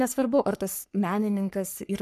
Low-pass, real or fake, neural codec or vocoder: 14.4 kHz; fake; codec, 44.1 kHz, 3.4 kbps, Pupu-Codec